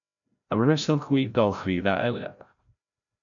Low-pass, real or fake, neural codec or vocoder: 7.2 kHz; fake; codec, 16 kHz, 0.5 kbps, FreqCodec, larger model